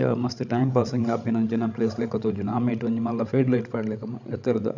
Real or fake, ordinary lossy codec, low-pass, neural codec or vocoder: fake; none; 7.2 kHz; codec, 16 kHz, 16 kbps, FreqCodec, larger model